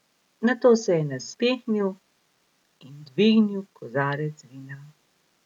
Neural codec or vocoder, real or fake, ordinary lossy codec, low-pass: none; real; none; 19.8 kHz